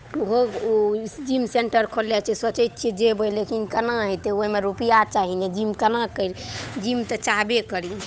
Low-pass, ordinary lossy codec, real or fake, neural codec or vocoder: none; none; fake; codec, 16 kHz, 8 kbps, FunCodec, trained on Chinese and English, 25 frames a second